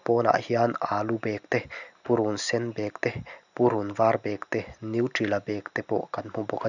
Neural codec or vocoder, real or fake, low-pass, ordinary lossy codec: none; real; 7.2 kHz; none